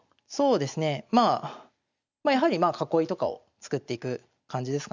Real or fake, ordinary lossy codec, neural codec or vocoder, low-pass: real; none; none; 7.2 kHz